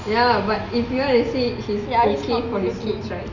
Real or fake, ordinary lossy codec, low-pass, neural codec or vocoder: real; none; 7.2 kHz; none